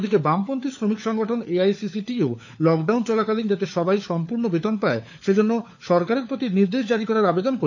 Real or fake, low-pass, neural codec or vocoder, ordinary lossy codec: fake; 7.2 kHz; codec, 16 kHz, 4 kbps, FunCodec, trained on LibriTTS, 50 frames a second; none